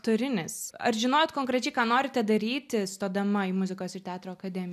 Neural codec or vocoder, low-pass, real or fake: none; 14.4 kHz; real